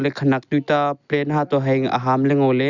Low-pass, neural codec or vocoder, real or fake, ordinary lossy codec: 7.2 kHz; none; real; Opus, 64 kbps